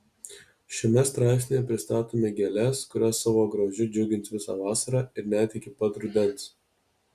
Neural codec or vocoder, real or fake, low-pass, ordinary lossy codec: none; real; 14.4 kHz; Opus, 64 kbps